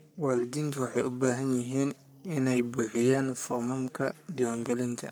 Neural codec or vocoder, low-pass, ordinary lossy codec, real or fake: codec, 44.1 kHz, 3.4 kbps, Pupu-Codec; none; none; fake